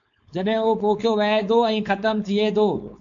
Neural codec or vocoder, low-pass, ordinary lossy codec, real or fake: codec, 16 kHz, 4.8 kbps, FACodec; 7.2 kHz; AAC, 64 kbps; fake